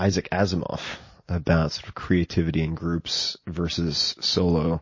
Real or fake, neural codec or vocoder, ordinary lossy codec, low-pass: real; none; MP3, 32 kbps; 7.2 kHz